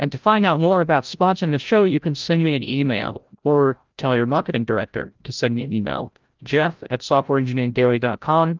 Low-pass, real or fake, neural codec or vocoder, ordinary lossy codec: 7.2 kHz; fake; codec, 16 kHz, 0.5 kbps, FreqCodec, larger model; Opus, 24 kbps